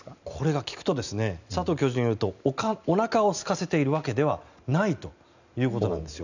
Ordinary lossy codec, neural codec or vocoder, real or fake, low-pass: none; none; real; 7.2 kHz